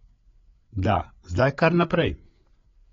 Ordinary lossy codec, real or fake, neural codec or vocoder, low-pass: AAC, 32 kbps; fake; codec, 16 kHz, 8 kbps, FreqCodec, larger model; 7.2 kHz